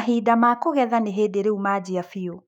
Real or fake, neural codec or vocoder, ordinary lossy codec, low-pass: fake; autoencoder, 48 kHz, 128 numbers a frame, DAC-VAE, trained on Japanese speech; none; 19.8 kHz